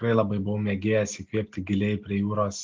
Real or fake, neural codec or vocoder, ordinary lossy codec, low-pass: real; none; Opus, 32 kbps; 7.2 kHz